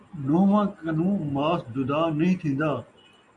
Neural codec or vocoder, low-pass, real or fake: none; 10.8 kHz; real